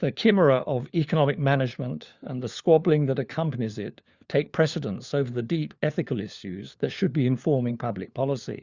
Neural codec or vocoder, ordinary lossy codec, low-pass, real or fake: codec, 16 kHz, 4 kbps, FunCodec, trained on LibriTTS, 50 frames a second; Opus, 64 kbps; 7.2 kHz; fake